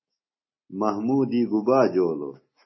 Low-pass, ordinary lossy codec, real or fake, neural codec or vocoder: 7.2 kHz; MP3, 24 kbps; real; none